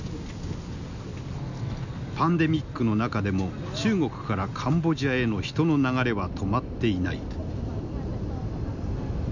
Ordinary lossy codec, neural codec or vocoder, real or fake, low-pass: none; none; real; 7.2 kHz